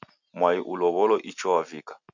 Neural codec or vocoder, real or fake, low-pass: none; real; 7.2 kHz